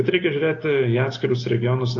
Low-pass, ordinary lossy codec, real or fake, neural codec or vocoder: 7.2 kHz; AAC, 32 kbps; real; none